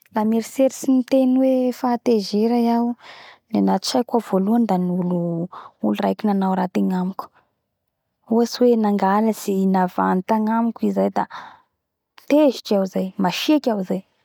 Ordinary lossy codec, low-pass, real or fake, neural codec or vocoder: none; 19.8 kHz; real; none